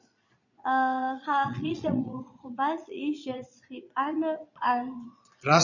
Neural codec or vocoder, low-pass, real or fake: vocoder, 22.05 kHz, 80 mel bands, Vocos; 7.2 kHz; fake